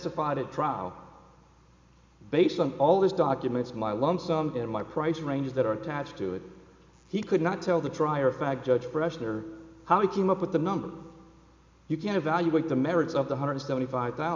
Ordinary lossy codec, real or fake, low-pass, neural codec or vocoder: MP3, 64 kbps; real; 7.2 kHz; none